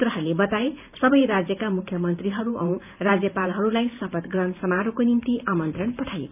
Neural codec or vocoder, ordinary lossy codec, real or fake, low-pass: vocoder, 44.1 kHz, 128 mel bands every 512 samples, BigVGAN v2; none; fake; 3.6 kHz